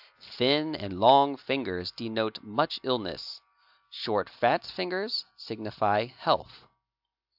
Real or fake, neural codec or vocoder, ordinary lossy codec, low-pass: real; none; AAC, 48 kbps; 5.4 kHz